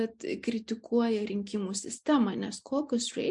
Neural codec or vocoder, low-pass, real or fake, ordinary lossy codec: none; 10.8 kHz; real; MP3, 64 kbps